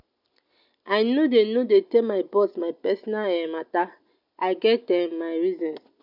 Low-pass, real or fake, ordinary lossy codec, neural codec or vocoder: 5.4 kHz; real; none; none